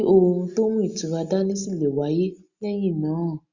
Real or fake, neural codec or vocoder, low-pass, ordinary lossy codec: real; none; 7.2 kHz; Opus, 64 kbps